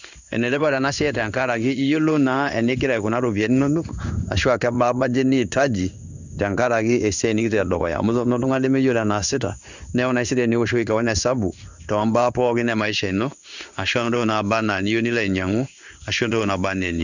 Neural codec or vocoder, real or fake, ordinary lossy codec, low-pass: codec, 16 kHz in and 24 kHz out, 1 kbps, XY-Tokenizer; fake; none; 7.2 kHz